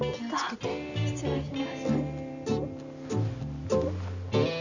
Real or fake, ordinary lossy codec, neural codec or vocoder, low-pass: real; none; none; 7.2 kHz